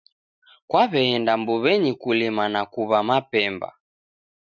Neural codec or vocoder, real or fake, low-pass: none; real; 7.2 kHz